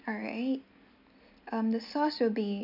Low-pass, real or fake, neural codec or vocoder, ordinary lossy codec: 5.4 kHz; real; none; none